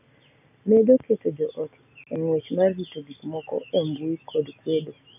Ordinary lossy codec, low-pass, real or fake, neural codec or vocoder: none; 3.6 kHz; real; none